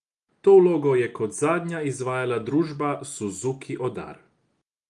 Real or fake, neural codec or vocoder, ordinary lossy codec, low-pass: real; none; Opus, 32 kbps; 10.8 kHz